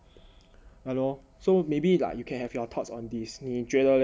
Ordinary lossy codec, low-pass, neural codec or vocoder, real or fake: none; none; none; real